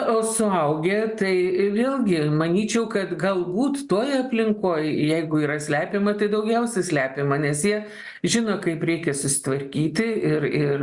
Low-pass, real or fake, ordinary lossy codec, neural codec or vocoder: 10.8 kHz; real; Opus, 64 kbps; none